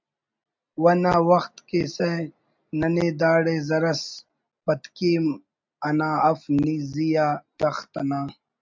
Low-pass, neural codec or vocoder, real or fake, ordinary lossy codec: 7.2 kHz; none; real; MP3, 64 kbps